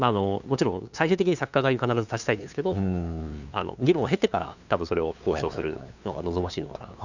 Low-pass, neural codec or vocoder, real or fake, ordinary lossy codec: 7.2 kHz; codec, 16 kHz, 2 kbps, FunCodec, trained on LibriTTS, 25 frames a second; fake; none